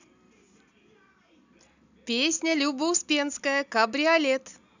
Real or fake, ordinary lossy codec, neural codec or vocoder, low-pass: real; none; none; 7.2 kHz